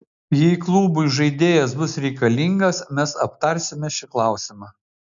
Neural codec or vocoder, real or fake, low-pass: none; real; 7.2 kHz